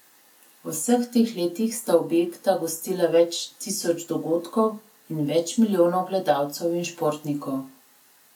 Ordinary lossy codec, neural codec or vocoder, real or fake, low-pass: none; none; real; 19.8 kHz